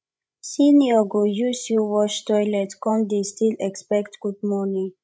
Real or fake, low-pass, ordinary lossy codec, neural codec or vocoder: fake; none; none; codec, 16 kHz, 16 kbps, FreqCodec, larger model